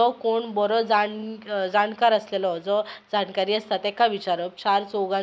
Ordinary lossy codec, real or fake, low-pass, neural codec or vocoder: none; real; none; none